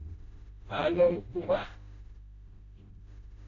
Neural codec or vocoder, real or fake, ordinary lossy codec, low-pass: codec, 16 kHz, 0.5 kbps, FreqCodec, smaller model; fake; AAC, 32 kbps; 7.2 kHz